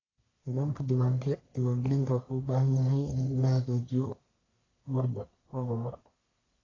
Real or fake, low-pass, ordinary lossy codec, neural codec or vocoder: fake; 7.2 kHz; AAC, 32 kbps; codec, 44.1 kHz, 1.7 kbps, Pupu-Codec